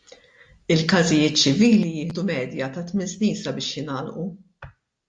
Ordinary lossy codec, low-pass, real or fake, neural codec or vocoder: AAC, 64 kbps; 9.9 kHz; real; none